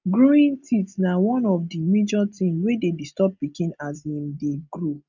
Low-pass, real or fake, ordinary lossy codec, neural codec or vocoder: 7.2 kHz; real; none; none